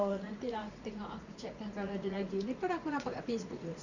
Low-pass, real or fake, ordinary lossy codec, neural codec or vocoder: 7.2 kHz; fake; none; vocoder, 44.1 kHz, 80 mel bands, Vocos